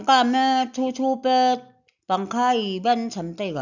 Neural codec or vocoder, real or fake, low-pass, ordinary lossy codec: none; real; 7.2 kHz; none